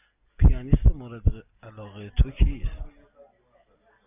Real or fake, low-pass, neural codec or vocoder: real; 3.6 kHz; none